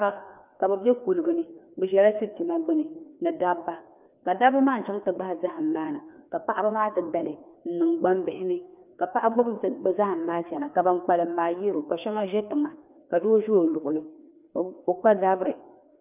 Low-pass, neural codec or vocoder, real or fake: 3.6 kHz; codec, 16 kHz, 2 kbps, FreqCodec, larger model; fake